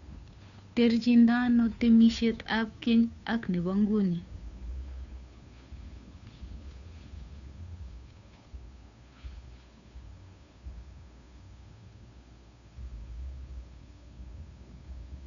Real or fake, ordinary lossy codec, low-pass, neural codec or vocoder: fake; none; 7.2 kHz; codec, 16 kHz, 2 kbps, FunCodec, trained on Chinese and English, 25 frames a second